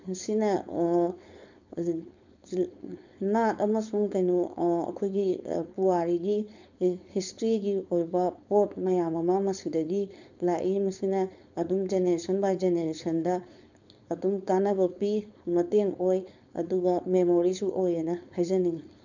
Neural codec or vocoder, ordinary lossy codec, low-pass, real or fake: codec, 16 kHz, 4.8 kbps, FACodec; none; 7.2 kHz; fake